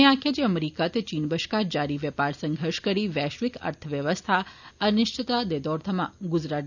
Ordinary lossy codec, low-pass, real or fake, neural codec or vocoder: none; 7.2 kHz; real; none